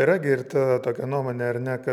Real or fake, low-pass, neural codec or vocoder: real; 19.8 kHz; none